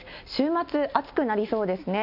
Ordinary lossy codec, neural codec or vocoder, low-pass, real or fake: none; none; 5.4 kHz; real